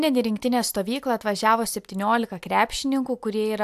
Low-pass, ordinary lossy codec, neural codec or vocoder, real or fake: 14.4 kHz; AAC, 96 kbps; none; real